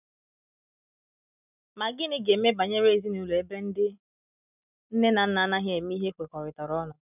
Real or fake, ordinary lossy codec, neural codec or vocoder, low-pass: real; none; none; 3.6 kHz